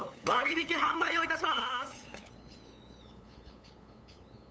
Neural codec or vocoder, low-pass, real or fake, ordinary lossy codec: codec, 16 kHz, 8 kbps, FunCodec, trained on LibriTTS, 25 frames a second; none; fake; none